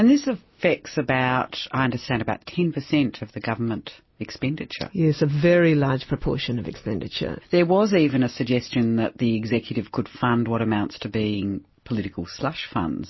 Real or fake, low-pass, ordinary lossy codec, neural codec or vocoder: real; 7.2 kHz; MP3, 24 kbps; none